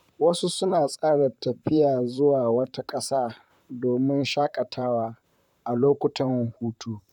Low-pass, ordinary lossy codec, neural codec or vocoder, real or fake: 19.8 kHz; none; vocoder, 44.1 kHz, 128 mel bands, Pupu-Vocoder; fake